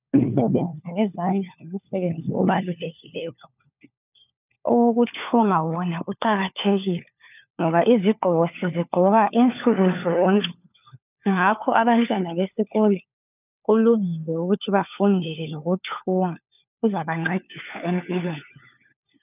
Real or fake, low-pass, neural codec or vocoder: fake; 3.6 kHz; codec, 16 kHz, 4 kbps, FunCodec, trained on LibriTTS, 50 frames a second